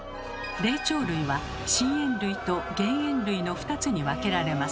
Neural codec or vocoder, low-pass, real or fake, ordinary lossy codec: none; none; real; none